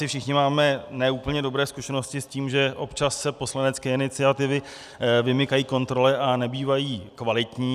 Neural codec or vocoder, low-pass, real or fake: none; 14.4 kHz; real